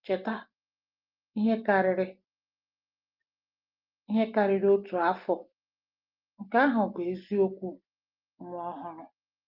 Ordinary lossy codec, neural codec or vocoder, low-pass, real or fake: Opus, 24 kbps; none; 5.4 kHz; real